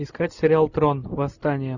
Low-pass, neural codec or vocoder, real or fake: 7.2 kHz; none; real